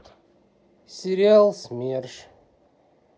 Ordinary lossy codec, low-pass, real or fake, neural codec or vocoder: none; none; real; none